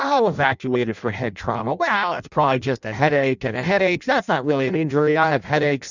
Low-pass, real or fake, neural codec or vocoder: 7.2 kHz; fake; codec, 16 kHz in and 24 kHz out, 0.6 kbps, FireRedTTS-2 codec